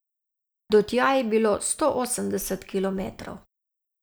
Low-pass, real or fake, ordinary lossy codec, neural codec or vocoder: none; real; none; none